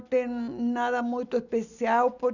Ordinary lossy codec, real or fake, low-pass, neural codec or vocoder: none; real; 7.2 kHz; none